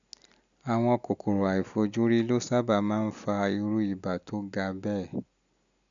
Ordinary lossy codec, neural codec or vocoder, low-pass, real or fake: none; none; 7.2 kHz; real